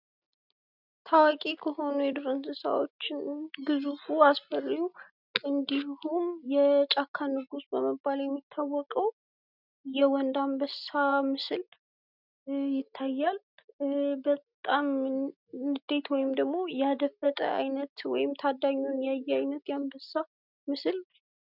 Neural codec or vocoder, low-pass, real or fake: none; 5.4 kHz; real